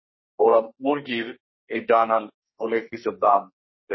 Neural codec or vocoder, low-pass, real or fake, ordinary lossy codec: codec, 32 kHz, 1.9 kbps, SNAC; 7.2 kHz; fake; MP3, 24 kbps